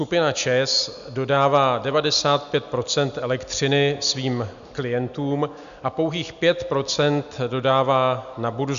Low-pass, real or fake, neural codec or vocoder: 7.2 kHz; real; none